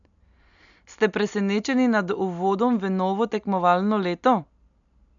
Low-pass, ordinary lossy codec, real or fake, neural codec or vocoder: 7.2 kHz; none; real; none